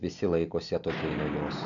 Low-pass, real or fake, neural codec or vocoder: 7.2 kHz; real; none